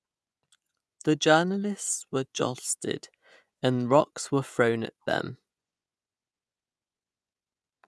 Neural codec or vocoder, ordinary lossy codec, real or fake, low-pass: vocoder, 24 kHz, 100 mel bands, Vocos; none; fake; none